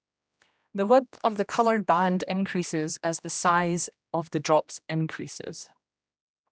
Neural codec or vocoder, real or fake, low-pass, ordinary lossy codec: codec, 16 kHz, 1 kbps, X-Codec, HuBERT features, trained on general audio; fake; none; none